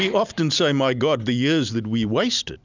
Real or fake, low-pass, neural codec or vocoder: real; 7.2 kHz; none